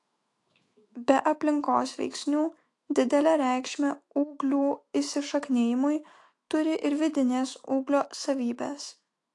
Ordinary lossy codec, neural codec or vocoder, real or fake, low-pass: AAC, 48 kbps; autoencoder, 48 kHz, 128 numbers a frame, DAC-VAE, trained on Japanese speech; fake; 10.8 kHz